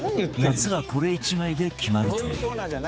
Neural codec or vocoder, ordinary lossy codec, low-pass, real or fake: codec, 16 kHz, 4 kbps, X-Codec, HuBERT features, trained on general audio; none; none; fake